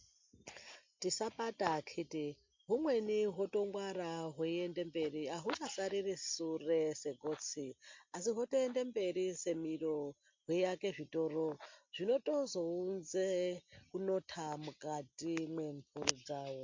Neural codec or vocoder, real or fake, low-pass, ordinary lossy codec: none; real; 7.2 kHz; MP3, 48 kbps